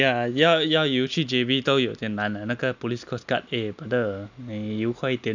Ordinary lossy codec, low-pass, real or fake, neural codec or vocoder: none; 7.2 kHz; real; none